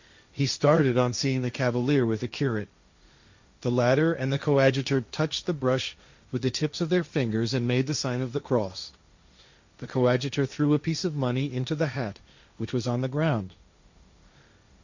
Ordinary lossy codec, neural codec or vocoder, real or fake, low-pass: Opus, 64 kbps; codec, 16 kHz, 1.1 kbps, Voila-Tokenizer; fake; 7.2 kHz